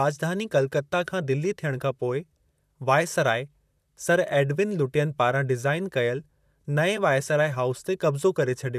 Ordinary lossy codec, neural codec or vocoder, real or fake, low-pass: none; vocoder, 44.1 kHz, 128 mel bands, Pupu-Vocoder; fake; 14.4 kHz